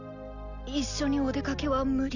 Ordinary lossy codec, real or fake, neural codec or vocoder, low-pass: none; real; none; 7.2 kHz